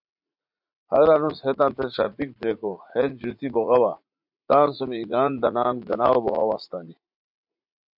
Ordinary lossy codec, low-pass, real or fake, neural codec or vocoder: MP3, 48 kbps; 5.4 kHz; fake; vocoder, 44.1 kHz, 80 mel bands, Vocos